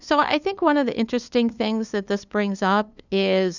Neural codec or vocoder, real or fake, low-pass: codec, 16 kHz, 2 kbps, FunCodec, trained on LibriTTS, 25 frames a second; fake; 7.2 kHz